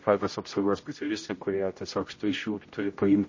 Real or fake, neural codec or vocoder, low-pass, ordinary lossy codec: fake; codec, 16 kHz, 0.5 kbps, X-Codec, HuBERT features, trained on general audio; 7.2 kHz; MP3, 32 kbps